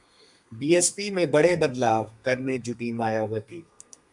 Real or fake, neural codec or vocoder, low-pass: fake; codec, 32 kHz, 1.9 kbps, SNAC; 10.8 kHz